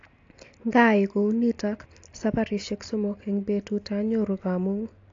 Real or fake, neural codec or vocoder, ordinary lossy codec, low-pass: real; none; AAC, 48 kbps; 7.2 kHz